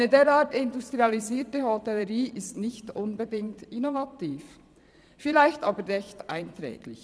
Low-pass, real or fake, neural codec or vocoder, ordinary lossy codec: none; fake; vocoder, 22.05 kHz, 80 mel bands, Vocos; none